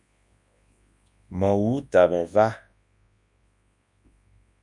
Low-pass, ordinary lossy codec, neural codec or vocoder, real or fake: 10.8 kHz; MP3, 96 kbps; codec, 24 kHz, 0.9 kbps, WavTokenizer, large speech release; fake